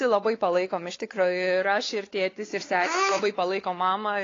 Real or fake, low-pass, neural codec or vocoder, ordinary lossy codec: fake; 7.2 kHz; codec, 16 kHz, 4 kbps, X-Codec, WavLM features, trained on Multilingual LibriSpeech; AAC, 32 kbps